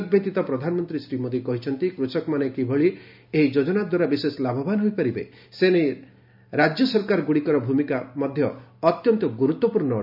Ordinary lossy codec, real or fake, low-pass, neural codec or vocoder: none; real; 5.4 kHz; none